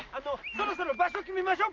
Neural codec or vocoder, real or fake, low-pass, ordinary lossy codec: none; real; 7.2 kHz; Opus, 24 kbps